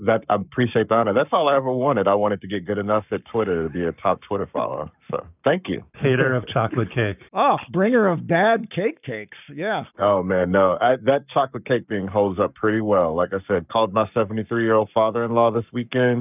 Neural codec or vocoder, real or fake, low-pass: codec, 44.1 kHz, 7.8 kbps, Pupu-Codec; fake; 3.6 kHz